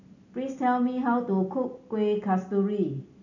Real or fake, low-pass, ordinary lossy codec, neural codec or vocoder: real; 7.2 kHz; none; none